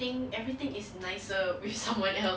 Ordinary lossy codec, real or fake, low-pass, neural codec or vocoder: none; real; none; none